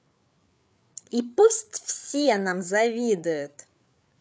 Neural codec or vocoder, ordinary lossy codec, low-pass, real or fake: codec, 16 kHz, 8 kbps, FreqCodec, larger model; none; none; fake